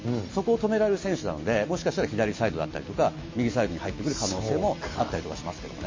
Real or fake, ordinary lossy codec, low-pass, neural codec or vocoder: real; MP3, 32 kbps; 7.2 kHz; none